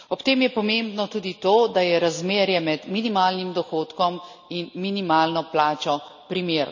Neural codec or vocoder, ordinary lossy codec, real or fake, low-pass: none; none; real; 7.2 kHz